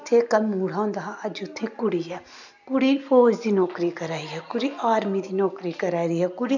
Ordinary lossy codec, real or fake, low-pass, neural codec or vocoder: none; real; 7.2 kHz; none